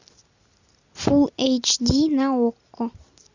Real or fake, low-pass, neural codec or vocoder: real; 7.2 kHz; none